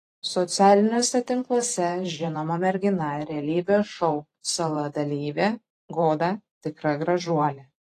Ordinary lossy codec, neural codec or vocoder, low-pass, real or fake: AAC, 48 kbps; vocoder, 44.1 kHz, 128 mel bands every 512 samples, BigVGAN v2; 14.4 kHz; fake